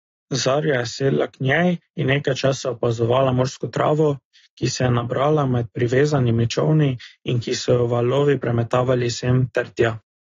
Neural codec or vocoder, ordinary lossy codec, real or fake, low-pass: none; AAC, 32 kbps; real; 7.2 kHz